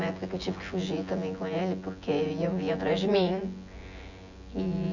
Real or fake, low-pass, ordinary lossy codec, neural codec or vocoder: fake; 7.2 kHz; none; vocoder, 24 kHz, 100 mel bands, Vocos